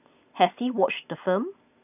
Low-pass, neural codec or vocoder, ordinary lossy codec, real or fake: 3.6 kHz; none; none; real